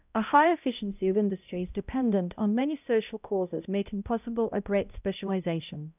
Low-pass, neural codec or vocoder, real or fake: 3.6 kHz; codec, 16 kHz, 0.5 kbps, X-Codec, HuBERT features, trained on balanced general audio; fake